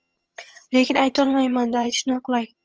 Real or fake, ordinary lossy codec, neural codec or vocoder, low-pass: fake; Opus, 24 kbps; vocoder, 22.05 kHz, 80 mel bands, HiFi-GAN; 7.2 kHz